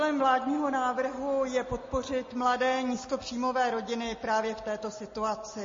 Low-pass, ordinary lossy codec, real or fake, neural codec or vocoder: 7.2 kHz; MP3, 32 kbps; real; none